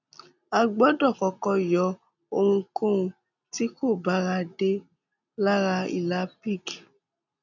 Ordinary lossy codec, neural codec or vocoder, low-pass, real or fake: none; none; 7.2 kHz; real